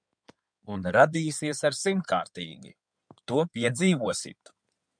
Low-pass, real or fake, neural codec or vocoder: 9.9 kHz; fake; codec, 16 kHz in and 24 kHz out, 2.2 kbps, FireRedTTS-2 codec